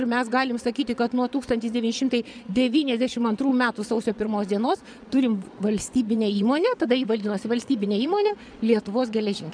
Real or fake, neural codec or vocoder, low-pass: fake; vocoder, 22.05 kHz, 80 mel bands, Vocos; 9.9 kHz